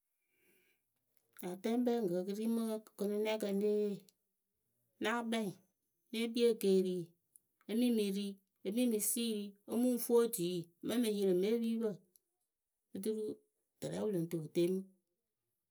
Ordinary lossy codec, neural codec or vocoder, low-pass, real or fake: none; none; none; real